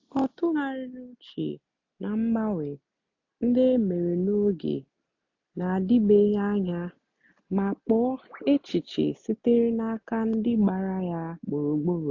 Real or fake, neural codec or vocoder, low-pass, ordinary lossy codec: real; none; 7.2 kHz; AAC, 48 kbps